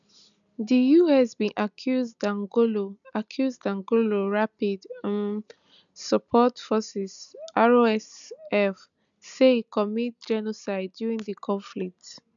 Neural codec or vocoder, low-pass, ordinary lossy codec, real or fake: none; 7.2 kHz; none; real